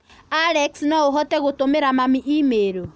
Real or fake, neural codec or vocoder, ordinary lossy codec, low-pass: real; none; none; none